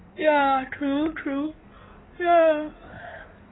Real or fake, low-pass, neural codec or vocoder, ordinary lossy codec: fake; 7.2 kHz; codec, 16 kHz in and 24 kHz out, 2.2 kbps, FireRedTTS-2 codec; AAC, 16 kbps